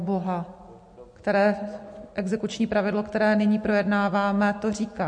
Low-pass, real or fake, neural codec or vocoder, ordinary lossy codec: 9.9 kHz; real; none; MP3, 48 kbps